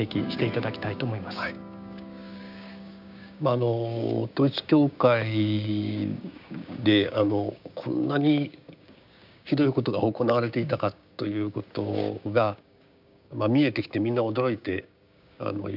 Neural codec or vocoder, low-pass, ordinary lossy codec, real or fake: codec, 16 kHz, 6 kbps, DAC; 5.4 kHz; none; fake